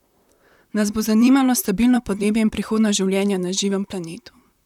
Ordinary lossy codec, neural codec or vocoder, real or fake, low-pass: none; vocoder, 44.1 kHz, 128 mel bands, Pupu-Vocoder; fake; 19.8 kHz